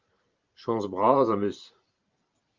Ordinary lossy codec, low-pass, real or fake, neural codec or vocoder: Opus, 24 kbps; 7.2 kHz; fake; vocoder, 44.1 kHz, 128 mel bands every 512 samples, BigVGAN v2